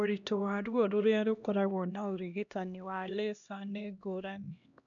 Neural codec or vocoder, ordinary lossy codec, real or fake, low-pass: codec, 16 kHz, 1 kbps, X-Codec, HuBERT features, trained on LibriSpeech; none; fake; 7.2 kHz